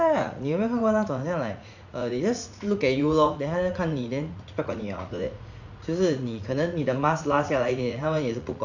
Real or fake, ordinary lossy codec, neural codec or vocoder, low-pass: fake; none; vocoder, 44.1 kHz, 80 mel bands, Vocos; 7.2 kHz